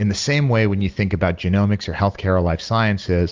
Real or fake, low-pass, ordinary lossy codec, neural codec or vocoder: real; 7.2 kHz; Opus, 32 kbps; none